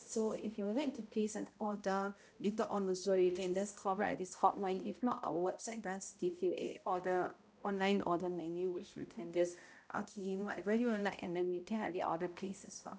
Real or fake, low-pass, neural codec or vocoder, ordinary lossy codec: fake; none; codec, 16 kHz, 0.5 kbps, X-Codec, HuBERT features, trained on balanced general audio; none